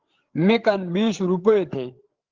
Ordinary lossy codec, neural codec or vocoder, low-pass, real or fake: Opus, 16 kbps; codec, 16 kHz, 8 kbps, FreqCodec, larger model; 7.2 kHz; fake